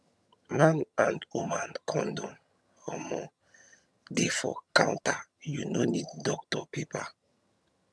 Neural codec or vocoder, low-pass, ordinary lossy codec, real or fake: vocoder, 22.05 kHz, 80 mel bands, HiFi-GAN; none; none; fake